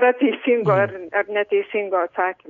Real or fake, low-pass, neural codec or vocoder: real; 7.2 kHz; none